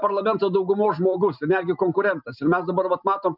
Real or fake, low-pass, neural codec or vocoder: real; 5.4 kHz; none